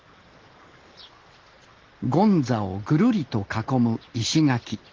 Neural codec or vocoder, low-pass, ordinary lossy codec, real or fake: none; 7.2 kHz; Opus, 16 kbps; real